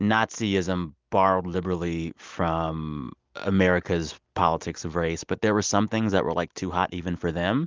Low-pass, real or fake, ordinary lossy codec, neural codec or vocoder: 7.2 kHz; real; Opus, 24 kbps; none